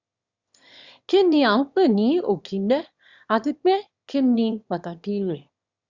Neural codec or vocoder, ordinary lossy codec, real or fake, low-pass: autoencoder, 22.05 kHz, a latent of 192 numbers a frame, VITS, trained on one speaker; Opus, 64 kbps; fake; 7.2 kHz